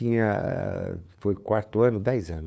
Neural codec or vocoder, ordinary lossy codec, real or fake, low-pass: codec, 16 kHz, 2 kbps, FunCodec, trained on LibriTTS, 25 frames a second; none; fake; none